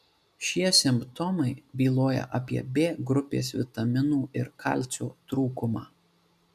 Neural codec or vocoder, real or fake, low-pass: none; real; 14.4 kHz